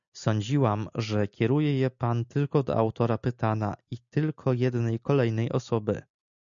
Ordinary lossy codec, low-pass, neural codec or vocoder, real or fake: MP3, 96 kbps; 7.2 kHz; none; real